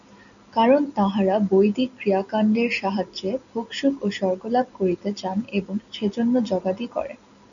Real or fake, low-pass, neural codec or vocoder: real; 7.2 kHz; none